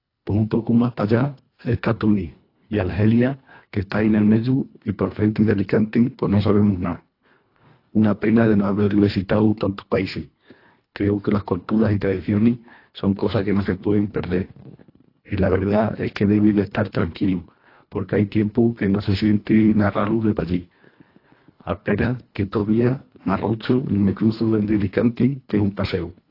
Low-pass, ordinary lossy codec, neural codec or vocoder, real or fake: 5.4 kHz; AAC, 32 kbps; codec, 24 kHz, 1.5 kbps, HILCodec; fake